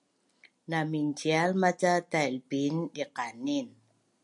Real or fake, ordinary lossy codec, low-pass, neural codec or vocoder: real; MP3, 64 kbps; 10.8 kHz; none